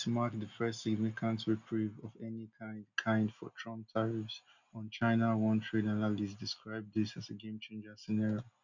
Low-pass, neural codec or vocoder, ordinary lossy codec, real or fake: 7.2 kHz; none; none; real